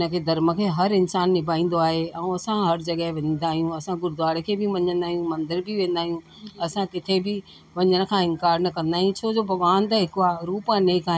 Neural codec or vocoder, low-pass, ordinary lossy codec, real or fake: none; none; none; real